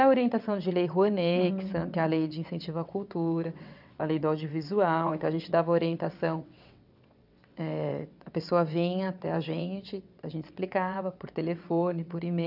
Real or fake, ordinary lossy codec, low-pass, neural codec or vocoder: fake; none; 5.4 kHz; vocoder, 22.05 kHz, 80 mel bands, WaveNeXt